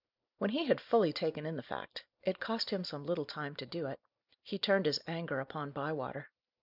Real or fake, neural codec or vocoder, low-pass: real; none; 5.4 kHz